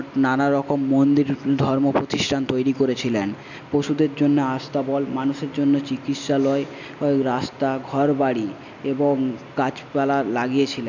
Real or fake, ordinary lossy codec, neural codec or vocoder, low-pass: real; none; none; 7.2 kHz